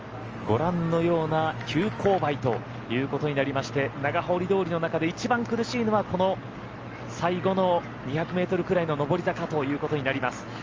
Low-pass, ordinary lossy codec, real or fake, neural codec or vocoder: 7.2 kHz; Opus, 24 kbps; real; none